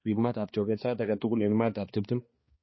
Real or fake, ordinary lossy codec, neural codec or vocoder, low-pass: fake; MP3, 24 kbps; codec, 16 kHz, 2 kbps, X-Codec, HuBERT features, trained on balanced general audio; 7.2 kHz